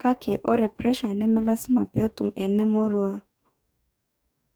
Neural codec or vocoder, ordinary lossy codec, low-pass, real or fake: codec, 44.1 kHz, 2.6 kbps, DAC; none; none; fake